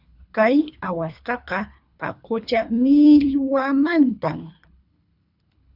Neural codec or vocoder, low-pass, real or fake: codec, 24 kHz, 3 kbps, HILCodec; 5.4 kHz; fake